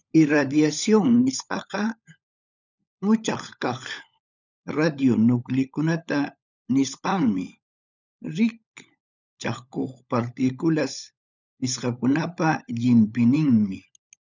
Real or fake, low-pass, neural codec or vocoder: fake; 7.2 kHz; codec, 16 kHz, 16 kbps, FunCodec, trained on LibriTTS, 50 frames a second